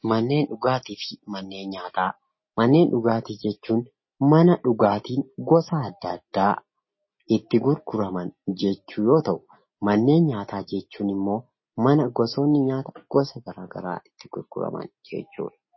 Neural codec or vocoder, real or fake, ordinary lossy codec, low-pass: none; real; MP3, 24 kbps; 7.2 kHz